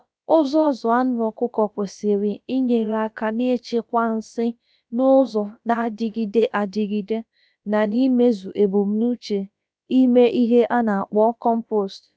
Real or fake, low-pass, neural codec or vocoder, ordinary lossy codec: fake; none; codec, 16 kHz, about 1 kbps, DyCAST, with the encoder's durations; none